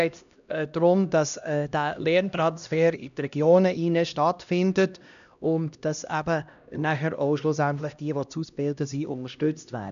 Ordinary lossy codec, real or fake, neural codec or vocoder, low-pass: none; fake; codec, 16 kHz, 1 kbps, X-Codec, HuBERT features, trained on LibriSpeech; 7.2 kHz